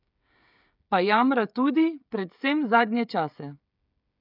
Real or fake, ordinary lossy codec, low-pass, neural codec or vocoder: fake; none; 5.4 kHz; codec, 16 kHz, 16 kbps, FreqCodec, smaller model